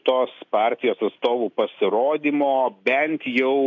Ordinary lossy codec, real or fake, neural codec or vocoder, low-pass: AAC, 48 kbps; real; none; 7.2 kHz